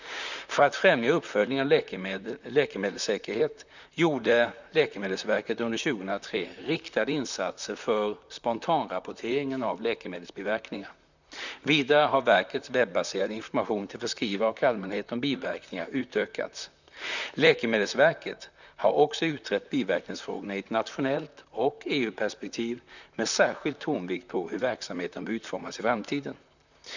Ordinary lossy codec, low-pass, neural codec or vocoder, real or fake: none; 7.2 kHz; vocoder, 44.1 kHz, 128 mel bands, Pupu-Vocoder; fake